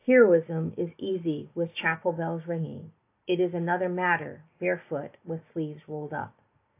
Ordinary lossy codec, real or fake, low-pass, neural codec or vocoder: AAC, 24 kbps; real; 3.6 kHz; none